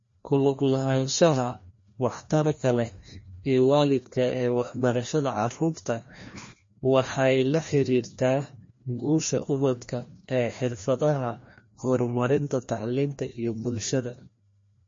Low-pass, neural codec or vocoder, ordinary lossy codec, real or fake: 7.2 kHz; codec, 16 kHz, 1 kbps, FreqCodec, larger model; MP3, 32 kbps; fake